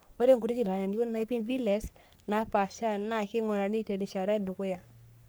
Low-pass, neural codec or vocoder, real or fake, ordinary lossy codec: none; codec, 44.1 kHz, 3.4 kbps, Pupu-Codec; fake; none